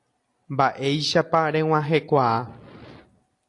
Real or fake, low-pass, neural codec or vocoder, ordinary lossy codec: real; 10.8 kHz; none; AAC, 48 kbps